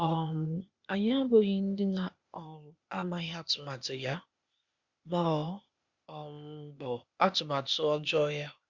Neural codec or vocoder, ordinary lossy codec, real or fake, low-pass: codec, 16 kHz, 0.8 kbps, ZipCodec; Opus, 64 kbps; fake; 7.2 kHz